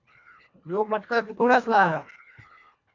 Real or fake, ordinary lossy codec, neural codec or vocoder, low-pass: fake; MP3, 64 kbps; codec, 24 kHz, 1.5 kbps, HILCodec; 7.2 kHz